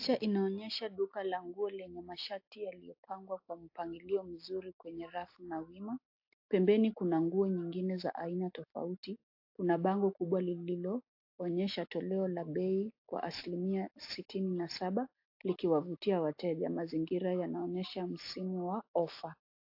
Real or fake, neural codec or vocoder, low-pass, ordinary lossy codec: real; none; 5.4 kHz; AAC, 48 kbps